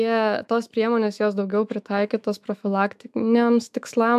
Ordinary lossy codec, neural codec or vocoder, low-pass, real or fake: AAC, 96 kbps; autoencoder, 48 kHz, 128 numbers a frame, DAC-VAE, trained on Japanese speech; 14.4 kHz; fake